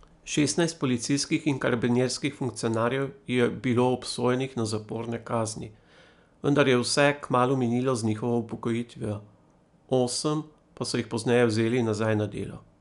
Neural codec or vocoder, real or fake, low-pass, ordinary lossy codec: none; real; 10.8 kHz; none